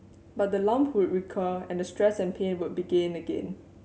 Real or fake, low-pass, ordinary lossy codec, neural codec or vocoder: real; none; none; none